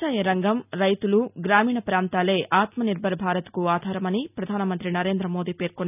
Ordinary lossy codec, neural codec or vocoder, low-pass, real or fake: none; none; 3.6 kHz; real